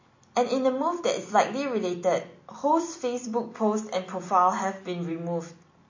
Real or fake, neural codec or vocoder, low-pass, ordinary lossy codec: real; none; 7.2 kHz; MP3, 32 kbps